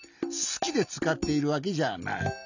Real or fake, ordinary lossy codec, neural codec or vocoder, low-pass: real; none; none; 7.2 kHz